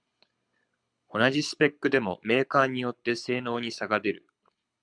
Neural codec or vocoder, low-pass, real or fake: codec, 24 kHz, 6 kbps, HILCodec; 9.9 kHz; fake